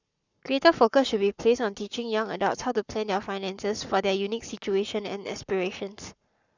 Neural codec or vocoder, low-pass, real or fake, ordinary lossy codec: codec, 44.1 kHz, 7.8 kbps, Pupu-Codec; 7.2 kHz; fake; none